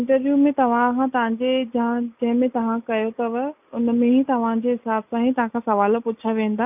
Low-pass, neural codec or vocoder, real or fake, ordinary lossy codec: 3.6 kHz; none; real; none